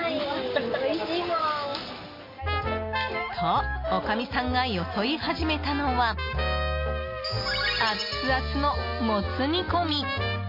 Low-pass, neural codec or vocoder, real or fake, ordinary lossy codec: 5.4 kHz; none; real; MP3, 32 kbps